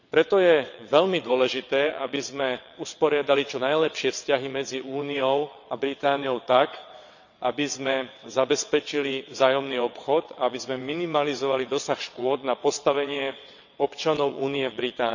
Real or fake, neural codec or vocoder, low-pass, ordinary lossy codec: fake; vocoder, 22.05 kHz, 80 mel bands, WaveNeXt; 7.2 kHz; none